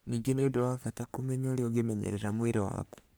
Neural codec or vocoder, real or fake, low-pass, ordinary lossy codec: codec, 44.1 kHz, 3.4 kbps, Pupu-Codec; fake; none; none